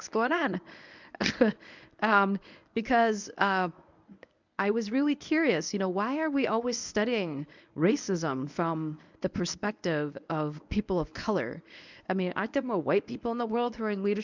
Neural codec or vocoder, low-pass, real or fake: codec, 24 kHz, 0.9 kbps, WavTokenizer, medium speech release version 1; 7.2 kHz; fake